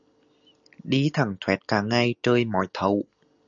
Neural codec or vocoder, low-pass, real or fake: none; 7.2 kHz; real